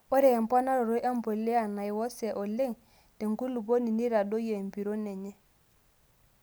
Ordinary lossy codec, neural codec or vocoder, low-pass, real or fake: none; none; none; real